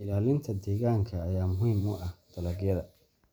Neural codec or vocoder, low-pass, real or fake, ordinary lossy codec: none; none; real; none